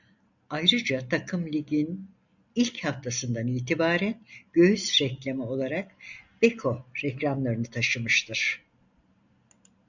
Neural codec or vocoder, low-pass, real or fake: none; 7.2 kHz; real